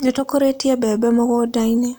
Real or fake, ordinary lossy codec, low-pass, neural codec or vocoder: real; none; none; none